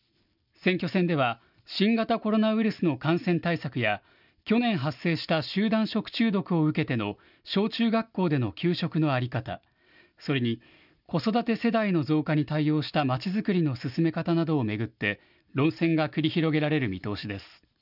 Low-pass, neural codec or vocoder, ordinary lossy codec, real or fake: 5.4 kHz; none; none; real